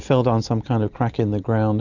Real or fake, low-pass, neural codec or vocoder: real; 7.2 kHz; none